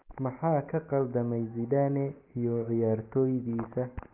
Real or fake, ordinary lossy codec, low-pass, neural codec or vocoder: real; Opus, 32 kbps; 3.6 kHz; none